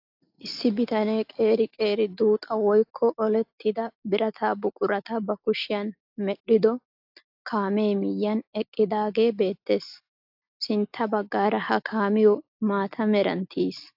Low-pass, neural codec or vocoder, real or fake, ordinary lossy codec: 5.4 kHz; none; real; AAC, 48 kbps